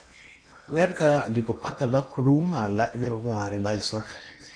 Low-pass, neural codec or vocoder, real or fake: 9.9 kHz; codec, 16 kHz in and 24 kHz out, 0.8 kbps, FocalCodec, streaming, 65536 codes; fake